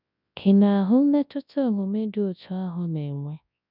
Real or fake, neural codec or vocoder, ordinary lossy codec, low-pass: fake; codec, 24 kHz, 0.9 kbps, WavTokenizer, large speech release; none; 5.4 kHz